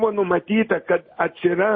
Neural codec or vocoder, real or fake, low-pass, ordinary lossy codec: none; real; 7.2 kHz; MP3, 24 kbps